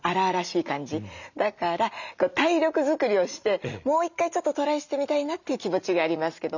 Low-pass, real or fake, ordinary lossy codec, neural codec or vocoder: 7.2 kHz; real; none; none